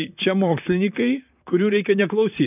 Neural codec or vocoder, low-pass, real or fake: vocoder, 44.1 kHz, 128 mel bands, Pupu-Vocoder; 3.6 kHz; fake